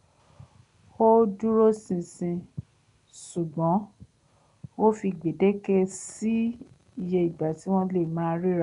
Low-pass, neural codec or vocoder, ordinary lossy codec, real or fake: 10.8 kHz; none; none; real